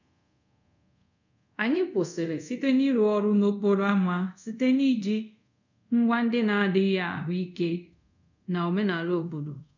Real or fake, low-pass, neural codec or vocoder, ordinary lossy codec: fake; 7.2 kHz; codec, 24 kHz, 0.5 kbps, DualCodec; none